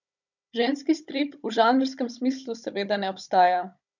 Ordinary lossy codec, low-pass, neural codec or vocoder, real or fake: none; 7.2 kHz; codec, 16 kHz, 16 kbps, FunCodec, trained on Chinese and English, 50 frames a second; fake